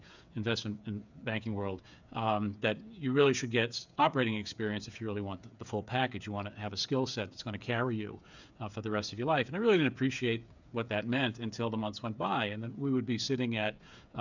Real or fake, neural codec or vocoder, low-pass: fake; codec, 16 kHz, 8 kbps, FreqCodec, smaller model; 7.2 kHz